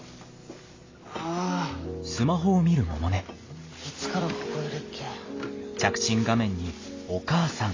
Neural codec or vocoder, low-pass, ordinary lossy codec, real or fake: none; 7.2 kHz; AAC, 32 kbps; real